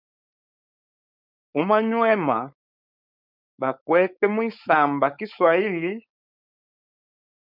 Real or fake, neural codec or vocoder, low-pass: fake; codec, 16 kHz, 4.8 kbps, FACodec; 5.4 kHz